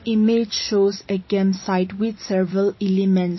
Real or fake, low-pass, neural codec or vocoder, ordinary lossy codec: fake; 7.2 kHz; vocoder, 44.1 kHz, 128 mel bands every 512 samples, BigVGAN v2; MP3, 24 kbps